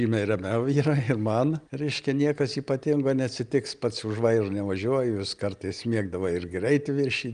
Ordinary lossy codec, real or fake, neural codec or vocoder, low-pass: Opus, 32 kbps; real; none; 9.9 kHz